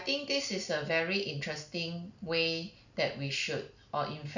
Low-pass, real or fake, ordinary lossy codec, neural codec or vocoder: 7.2 kHz; real; none; none